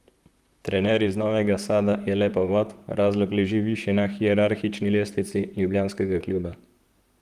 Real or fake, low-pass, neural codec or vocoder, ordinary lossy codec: fake; 14.4 kHz; vocoder, 44.1 kHz, 128 mel bands, Pupu-Vocoder; Opus, 32 kbps